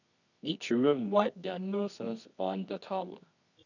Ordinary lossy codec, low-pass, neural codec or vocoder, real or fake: none; 7.2 kHz; codec, 24 kHz, 0.9 kbps, WavTokenizer, medium music audio release; fake